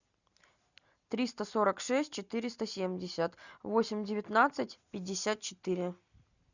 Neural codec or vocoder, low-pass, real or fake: none; 7.2 kHz; real